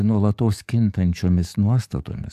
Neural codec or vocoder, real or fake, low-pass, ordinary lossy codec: codec, 44.1 kHz, 7.8 kbps, DAC; fake; 14.4 kHz; AAC, 96 kbps